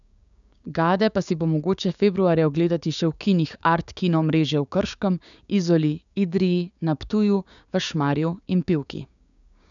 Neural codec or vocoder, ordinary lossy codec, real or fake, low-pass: codec, 16 kHz, 6 kbps, DAC; none; fake; 7.2 kHz